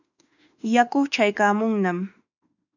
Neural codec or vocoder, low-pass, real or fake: autoencoder, 48 kHz, 32 numbers a frame, DAC-VAE, trained on Japanese speech; 7.2 kHz; fake